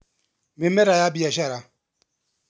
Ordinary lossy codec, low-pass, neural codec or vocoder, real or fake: none; none; none; real